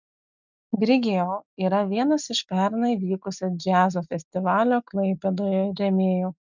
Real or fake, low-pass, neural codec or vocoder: real; 7.2 kHz; none